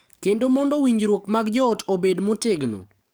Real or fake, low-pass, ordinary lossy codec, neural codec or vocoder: fake; none; none; codec, 44.1 kHz, 7.8 kbps, DAC